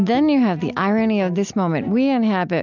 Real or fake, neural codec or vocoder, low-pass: real; none; 7.2 kHz